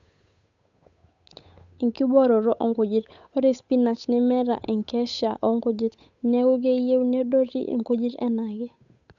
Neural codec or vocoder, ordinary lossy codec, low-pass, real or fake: codec, 16 kHz, 8 kbps, FunCodec, trained on Chinese and English, 25 frames a second; none; 7.2 kHz; fake